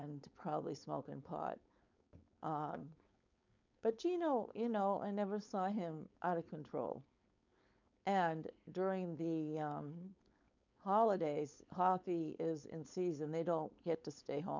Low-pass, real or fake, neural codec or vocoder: 7.2 kHz; fake; codec, 16 kHz, 4.8 kbps, FACodec